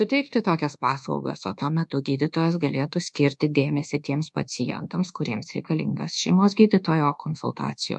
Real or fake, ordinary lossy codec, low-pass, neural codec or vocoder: fake; MP3, 64 kbps; 10.8 kHz; codec, 24 kHz, 1.2 kbps, DualCodec